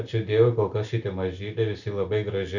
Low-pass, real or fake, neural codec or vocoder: 7.2 kHz; real; none